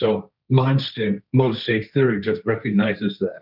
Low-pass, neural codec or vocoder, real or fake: 5.4 kHz; codec, 16 kHz, 1.1 kbps, Voila-Tokenizer; fake